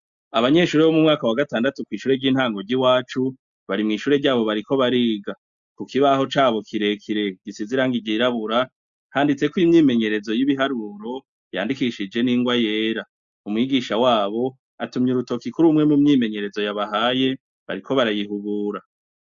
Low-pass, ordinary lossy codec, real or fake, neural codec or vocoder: 7.2 kHz; MP3, 64 kbps; real; none